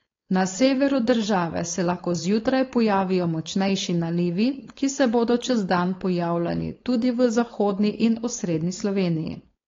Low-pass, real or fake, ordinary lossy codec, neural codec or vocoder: 7.2 kHz; fake; AAC, 32 kbps; codec, 16 kHz, 4.8 kbps, FACodec